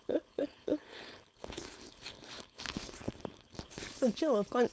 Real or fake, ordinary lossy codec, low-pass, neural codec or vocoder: fake; none; none; codec, 16 kHz, 4.8 kbps, FACodec